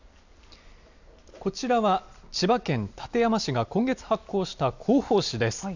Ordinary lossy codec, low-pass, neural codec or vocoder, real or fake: none; 7.2 kHz; none; real